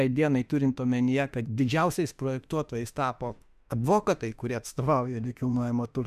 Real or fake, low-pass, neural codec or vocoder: fake; 14.4 kHz; autoencoder, 48 kHz, 32 numbers a frame, DAC-VAE, trained on Japanese speech